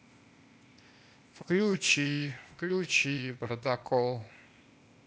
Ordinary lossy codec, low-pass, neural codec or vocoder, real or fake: none; none; codec, 16 kHz, 0.8 kbps, ZipCodec; fake